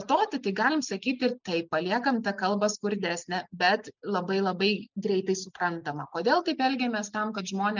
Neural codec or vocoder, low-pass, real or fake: none; 7.2 kHz; real